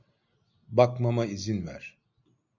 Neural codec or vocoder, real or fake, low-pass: none; real; 7.2 kHz